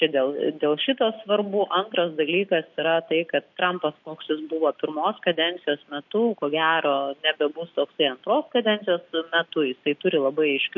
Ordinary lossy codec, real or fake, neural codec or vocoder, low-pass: MP3, 48 kbps; real; none; 7.2 kHz